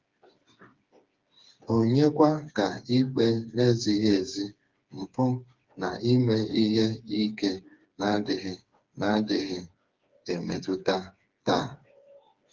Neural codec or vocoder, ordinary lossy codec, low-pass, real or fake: codec, 16 kHz, 4 kbps, FreqCodec, smaller model; Opus, 32 kbps; 7.2 kHz; fake